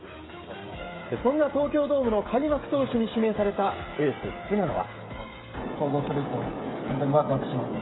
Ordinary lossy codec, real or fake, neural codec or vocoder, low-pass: AAC, 16 kbps; fake; codec, 16 kHz, 16 kbps, FreqCodec, smaller model; 7.2 kHz